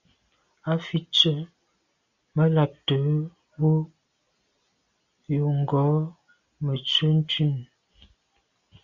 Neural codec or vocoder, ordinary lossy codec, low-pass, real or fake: none; Opus, 64 kbps; 7.2 kHz; real